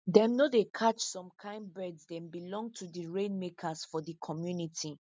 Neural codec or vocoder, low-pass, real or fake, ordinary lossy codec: none; none; real; none